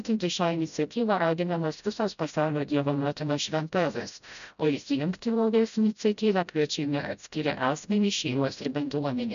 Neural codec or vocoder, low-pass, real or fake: codec, 16 kHz, 0.5 kbps, FreqCodec, smaller model; 7.2 kHz; fake